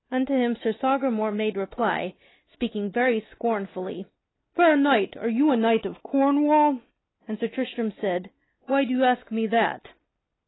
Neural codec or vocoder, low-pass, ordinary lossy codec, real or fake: none; 7.2 kHz; AAC, 16 kbps; real